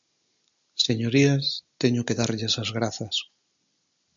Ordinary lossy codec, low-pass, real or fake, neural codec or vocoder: MP3, 64 kbps; 7.2 kHz; real; none